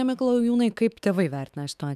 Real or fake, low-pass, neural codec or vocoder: fake; 14.4 kHz; autoencoder, 48 kHz, 128 numbers a frame, DAC-VAE, trained on Japanese speech